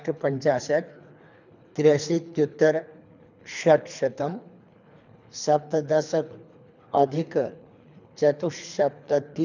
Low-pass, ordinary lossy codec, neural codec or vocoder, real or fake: 7.2 kHz; none; codec, 24 kHz, 3 kbps, HILCodec; fake